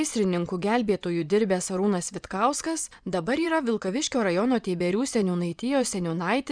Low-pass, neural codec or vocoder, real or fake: 9.9 kHz; vocoder, 24 kHz, 100 mel bands, Vocos; fake